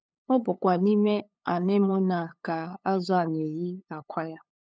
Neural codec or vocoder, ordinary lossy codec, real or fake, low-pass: codec, 16 kHz, 8 kbps, FunCodec, trained on LibriTTS, 25 frames a second; none; fake; none